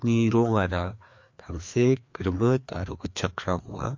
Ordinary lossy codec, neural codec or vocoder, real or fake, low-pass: MP3, 48 kbps; codec, 16 kHz, 2 kbps, FreqCodec, larger model; fake; 7.2 kHz